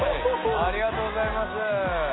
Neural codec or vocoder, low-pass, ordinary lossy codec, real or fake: none; 7.2 kHz; AAC, 16 kbps; real